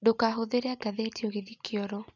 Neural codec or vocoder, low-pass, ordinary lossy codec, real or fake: none; 7.2 kHz; none; real